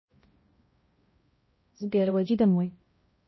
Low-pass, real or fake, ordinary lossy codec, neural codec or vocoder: 7.2 kHz; fake; MP3, 24 kbps; codec, 16 kHz, 0.5 kbps, X-Codec, HuBERT features, trained on balanced general audio